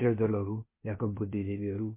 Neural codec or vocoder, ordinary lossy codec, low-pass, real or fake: codec, 16 kHz, 0.8 kbps, ZipCodec; MP3, 24 kbps; 3.6 kHz; fake